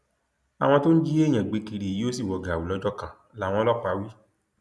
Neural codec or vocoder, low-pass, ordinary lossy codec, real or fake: none; none; none; real